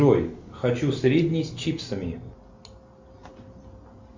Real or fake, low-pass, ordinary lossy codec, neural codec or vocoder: real; 7.2 kHz; MP3, 64 kbps; none